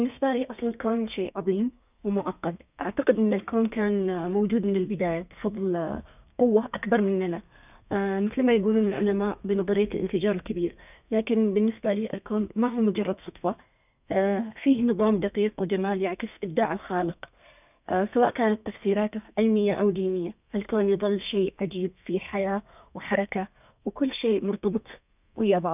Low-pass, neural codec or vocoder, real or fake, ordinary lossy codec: 3.6 kHz; codec, 32 kHz, 1.9 kbps, SNAC; fake; none